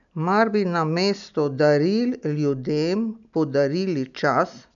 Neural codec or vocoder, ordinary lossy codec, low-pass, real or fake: none; none; 7.2 kHz; real